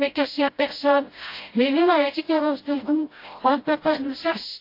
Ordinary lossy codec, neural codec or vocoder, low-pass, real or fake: AAC, 32 kbps; codec, 16 kHz, 0.5 kbps, FreqCodec, smaller model; 5.4 kHz; fake